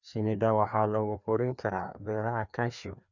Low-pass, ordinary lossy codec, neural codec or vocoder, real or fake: 7.2 kHz; none; codec, 16 kHz, 2 kbps, FreqCodec, larger model; fake